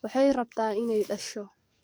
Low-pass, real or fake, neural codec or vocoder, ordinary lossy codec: none; fake; codec, 44.1 kHz, 7.8 kbps, DAC; none